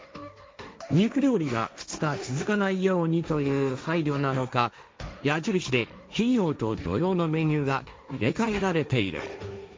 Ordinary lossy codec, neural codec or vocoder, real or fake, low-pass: none; codec, 16 kHz, 1.1 kbps, Voila-Tokenizer; fake; none